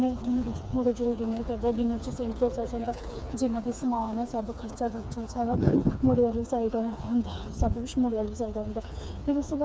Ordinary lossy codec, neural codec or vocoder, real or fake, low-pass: none; codec, 16 kHz, 4 kbps, FreqCodec, smaller model; fake; none